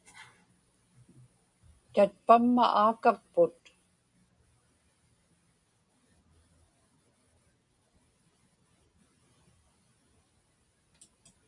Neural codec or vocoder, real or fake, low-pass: none; real; 10.8 kHz